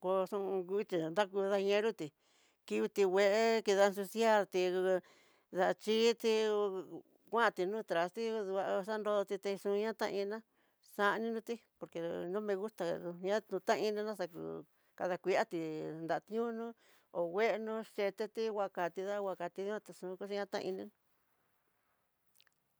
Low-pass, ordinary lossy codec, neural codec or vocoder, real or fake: none; none; none; real